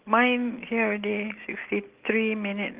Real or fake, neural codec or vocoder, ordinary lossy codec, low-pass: real; none; Opus, 16 kbps; 3.6 kHz